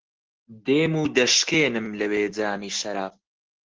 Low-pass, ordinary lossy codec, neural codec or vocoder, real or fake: 7.2 kHz; Opus, 16 kbps; none; real